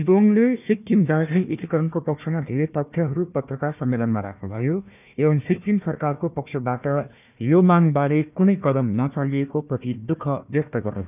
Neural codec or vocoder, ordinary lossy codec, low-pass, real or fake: codec, 16 kHz, 1 kbps, FunCodec, trained on Chinese and English, 50 frames a second; none; 3.6 kHz; fake